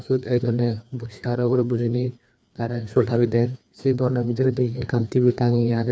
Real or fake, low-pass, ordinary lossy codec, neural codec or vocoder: fake; none; none; codec, 16 kHz, 2 kbps, FreqCodec, larger model